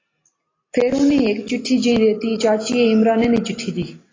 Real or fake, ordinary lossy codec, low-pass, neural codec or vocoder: real; AAC, 32 kbps; 7.2 kHz; none